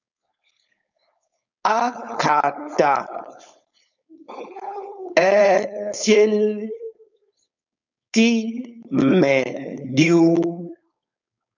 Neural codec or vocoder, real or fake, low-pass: codec, 16 kHz, 4.8 kbps, FACodec; fake; 7.2 kHz